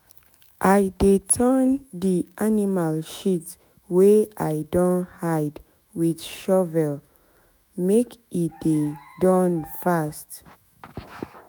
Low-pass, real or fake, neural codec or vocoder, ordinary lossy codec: none; fake; autoencoder, 48 kHz, 128 numbers a frame, DAC-VAE, trained on Japanese speech; none